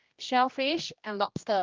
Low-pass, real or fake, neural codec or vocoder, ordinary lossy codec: 7.2 kHz; fake; codec, 16 kHz, 1 kbps, X-Codec, HuBERT features, trained on general audio; Opus, 32 kbps